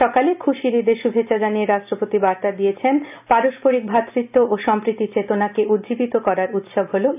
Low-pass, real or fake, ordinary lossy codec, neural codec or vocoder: 3.6 kHz; real; none; none